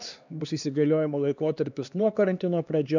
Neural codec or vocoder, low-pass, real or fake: codec, 16 kHz, 2 kbps, X-Codec, HuBERT features, trained on LibriSpeech; 7.2 kHz; fake